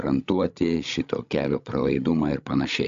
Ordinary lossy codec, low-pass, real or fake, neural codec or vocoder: AAC, 64 kbps; 7.2 kHz; fake; codec, 16 kHz, 16 kbps, FreqCodec, larger model